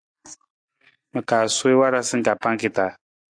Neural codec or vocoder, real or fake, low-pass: none; real; 9.9 kHz